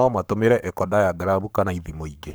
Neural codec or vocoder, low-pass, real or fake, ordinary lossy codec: codec, 44.1 kHz, 7.8 kbps, Pupu-Codec; none; fake; none